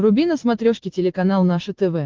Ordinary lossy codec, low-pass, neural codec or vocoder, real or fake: Opus, 16 kbps; 7.2 kHz; none; real